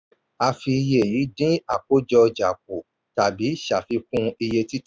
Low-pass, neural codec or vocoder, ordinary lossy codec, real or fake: none; none; none; real